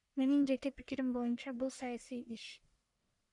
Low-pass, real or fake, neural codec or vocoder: 10.8 kHz; fake; codec, 44.1 kHz, 1.7 kbps, Pupu-Codec